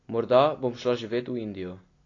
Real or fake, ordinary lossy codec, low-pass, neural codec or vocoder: real; AAC, 32 kbps; 7.2 kHz; none